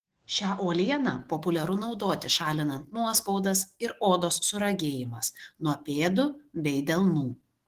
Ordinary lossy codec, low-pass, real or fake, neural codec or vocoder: Opus, 16 kbps; 14.4 kHz; fake; autoencoder, 48 kHz, 128 numbers a frame, DAC-VAE, trained on Japanese speech